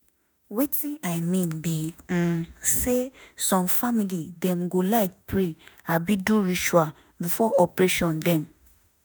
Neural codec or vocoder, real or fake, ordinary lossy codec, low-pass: autoencoder, 48 kHz, 32 numbers a frame, DAC-VAE, trained on Japanese speech; fake; none; none